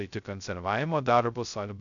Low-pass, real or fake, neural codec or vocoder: 7.2 kHz; fake; codec, 16 kHz, 0.2 kbps, FocalCodec